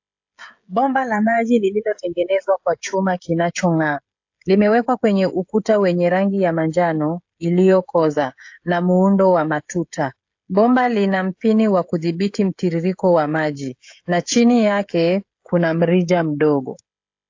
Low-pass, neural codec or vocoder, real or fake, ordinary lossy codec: 7.2 kHz; codec, 16 kHz, 16 kbps, FreqCodec, smaller model; fake; AAC, 48 kbps